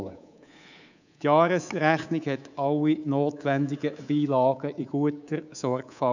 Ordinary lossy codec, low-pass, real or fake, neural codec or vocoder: none; 7.2 kHz; fake; codec, 24 kHz, 3.1 kbps, DualCodec